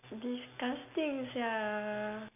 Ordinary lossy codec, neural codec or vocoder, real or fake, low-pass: none; none; real; 3.6 kHz